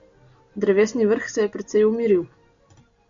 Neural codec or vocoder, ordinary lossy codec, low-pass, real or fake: none; MP3, 64 kbps; 7.2 kHz; real